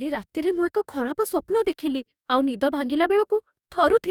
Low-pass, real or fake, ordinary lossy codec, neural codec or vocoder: 19.8 kHz; fake; none; codec, 44.1 kHz, 2.6 kbps, DAC